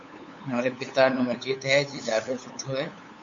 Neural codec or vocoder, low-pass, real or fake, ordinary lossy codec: codec, 16 kHz, 8 kbps, FunCodec, trained on LibriTTS, 25 frames a second; 7.2 kHz; fake; MP3, 48 kbps